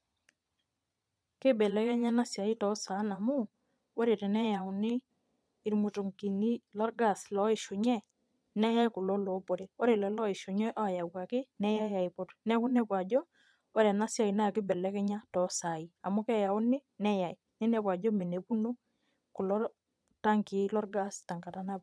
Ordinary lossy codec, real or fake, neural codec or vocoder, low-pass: none; fake; vocoder, 22.05 kHz, 80 mel bands, Vocos; none